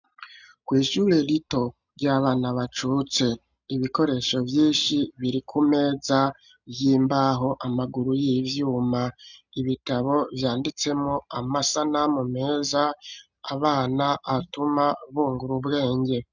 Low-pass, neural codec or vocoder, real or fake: 7.2 kHz; none; real